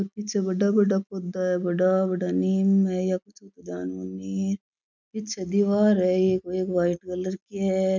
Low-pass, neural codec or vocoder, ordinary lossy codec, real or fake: 7.2 kHz; none; none; real